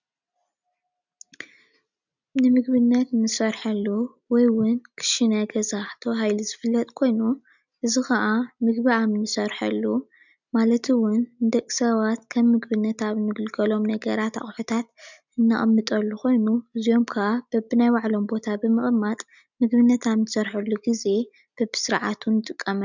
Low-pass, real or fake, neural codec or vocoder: 7.2 kHz; real; none